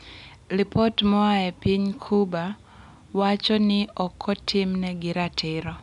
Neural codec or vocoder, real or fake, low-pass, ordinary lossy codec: none; real; 10.8 kHz; none